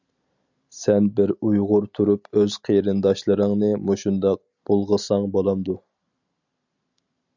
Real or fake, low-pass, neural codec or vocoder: real; 7.2 kHz; none